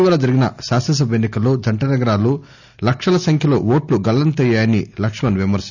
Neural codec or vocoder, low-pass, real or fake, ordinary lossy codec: none; 7.2 kHz; real; none